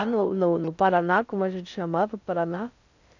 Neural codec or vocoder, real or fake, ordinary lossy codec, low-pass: codec, 16 kHz in and 24 kHz out, 0.6 kbps, FocalCodec, streaming, 2048 codes; fake; none; 7.2 kHz